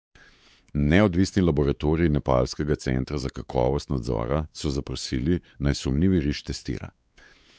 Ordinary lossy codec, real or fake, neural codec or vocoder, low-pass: none; fake; codec, 16 kHz, 4 kbps, X-Codec, WavLM features, trained on Multilingual LibriSpeech; none